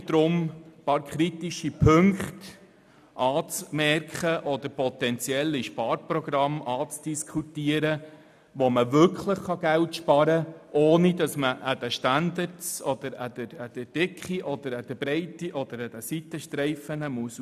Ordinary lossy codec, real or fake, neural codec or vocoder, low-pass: none; real; none; 14.4 kHz